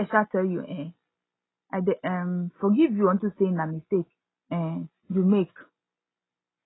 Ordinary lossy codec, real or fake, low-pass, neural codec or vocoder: AAC, 16 kbps; real; 7.2 kHz; none